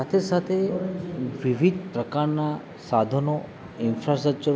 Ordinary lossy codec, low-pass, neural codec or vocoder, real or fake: none; none; none; real